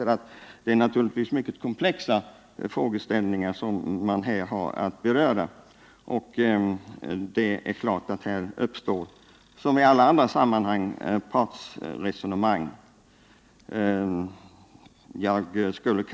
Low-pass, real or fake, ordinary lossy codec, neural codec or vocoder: none; real; none; none